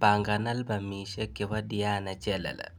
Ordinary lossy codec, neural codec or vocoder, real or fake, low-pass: none; none; real; none